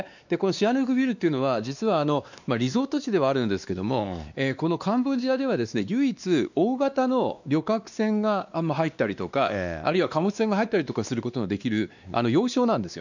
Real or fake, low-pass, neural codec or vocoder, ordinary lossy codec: fake; 7.2 kHz; codec, 16 kHz, 2 kbps, X-Codec, WavLM features, trained on Multilingual LibriSpeech; none